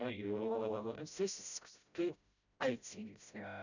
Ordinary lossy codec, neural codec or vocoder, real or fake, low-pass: none; codec, 16 kHz, 0.5 kbps, FreqCodec, smaller model; fake; 7.2 kHz